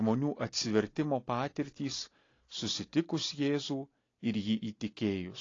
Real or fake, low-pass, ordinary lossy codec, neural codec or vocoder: real; 7.2 kHz; AAC, 32 kbps; none